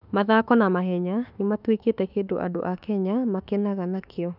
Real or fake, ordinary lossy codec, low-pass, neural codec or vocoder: fake; none; 5.4 kHz; autoencoder, 48 kHz, 32 numbers a frame, DAC-VAE, trained on Japanese speech